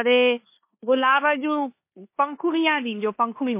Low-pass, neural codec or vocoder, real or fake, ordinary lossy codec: 3.6 kHz; codec, 16 kHz in and 24 kHz out, 0.9 kbps, LongCat-Audio-Codec, four codebook decoder; fake; MP3, 24 kbps